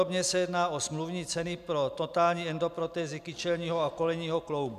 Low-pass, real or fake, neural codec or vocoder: 14.4 kHz; real; none